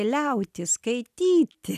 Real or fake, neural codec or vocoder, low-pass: real; none; 14.4 kHz